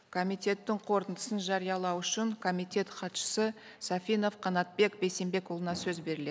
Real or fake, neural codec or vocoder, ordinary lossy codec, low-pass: real; none; none; none